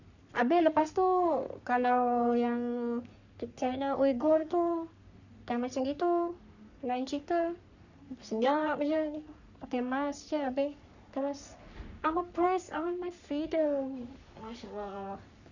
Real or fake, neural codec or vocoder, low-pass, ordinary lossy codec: fake; codec, 44.1 kHz, 3.4 kbps, Pupu-Codec; 7.2 kHz; none